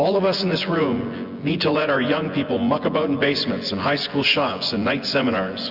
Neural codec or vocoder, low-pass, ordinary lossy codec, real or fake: vocoder, 24 kHz, 100 mel bands, Vocos; 5.4 kHz; Opus, 64 kbps; fake